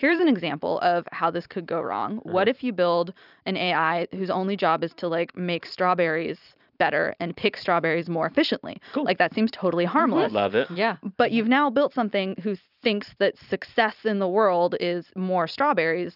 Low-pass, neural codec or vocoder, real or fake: 5.4 kHz; vocoder, 44.1 kHz, 128 mel bands every 256 samples, BigVGAN v2; fake